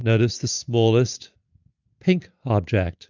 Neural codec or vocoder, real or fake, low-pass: none; real; 7.2 kHz